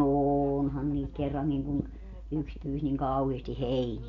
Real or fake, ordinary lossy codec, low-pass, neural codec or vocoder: real; none; 7.2 kHz; none